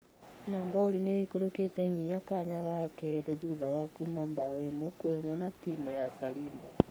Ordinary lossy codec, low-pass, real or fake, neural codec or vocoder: none; none; fake; codec, 44.1 kHz, 3.4 kbps, Pupu-Codec